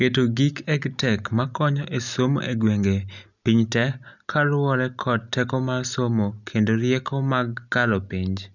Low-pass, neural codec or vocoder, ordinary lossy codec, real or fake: 7.2 kHz; none; none; real